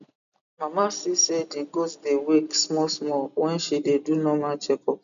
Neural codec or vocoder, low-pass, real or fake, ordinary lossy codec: none; 7.2 kHz; real; none